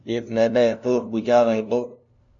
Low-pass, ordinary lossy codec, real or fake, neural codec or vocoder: 7.2 kHz; MP3, 64 kbps; fake; codec, 16 kHz, 0.5 kbps, FunCodec, trained on LibriTTS, 25 frames a second